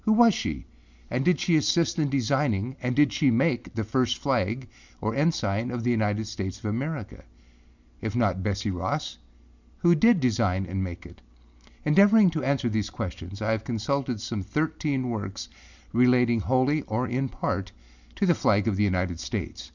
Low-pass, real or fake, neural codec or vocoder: 7.2 kHz; real; none